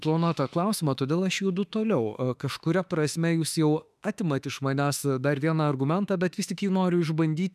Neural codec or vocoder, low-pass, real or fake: autoencoder, 48 kHz, 32 numbers a frame, DAC-VAE, trained on Japanese speech; 14.4 kHz; fake